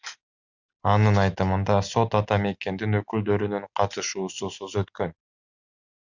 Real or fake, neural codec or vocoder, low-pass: real; none; 7.2 kHz